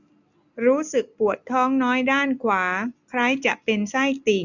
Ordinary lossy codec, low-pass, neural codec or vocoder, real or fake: none; 7.2 kHz; none; real